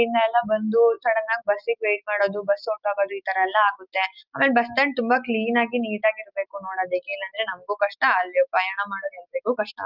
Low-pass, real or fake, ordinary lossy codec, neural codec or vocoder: 5.4 kHz; real; Opus, 32 kbps; none